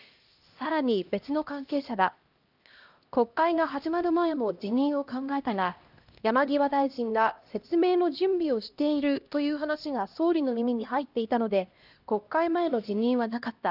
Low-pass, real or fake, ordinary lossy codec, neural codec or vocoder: 5.4 kHz; fake; Opus, 24 kbps; codec, 16 kHz, 1 kbps, X-Codec, HuBERT features, trained on LibriSpeech